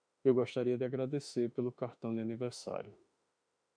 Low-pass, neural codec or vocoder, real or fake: 9.9 kHz; autoencoder, 48 kHz, 32 numbers a frame, DAC-VAE, trained on Japanese speech; fake